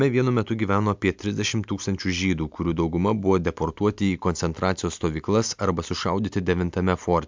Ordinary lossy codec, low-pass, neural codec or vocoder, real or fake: MP3, 64 kbps; 7.2 kHz; none; real